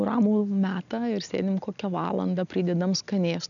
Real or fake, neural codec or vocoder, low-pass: real; none; 7.2 kHz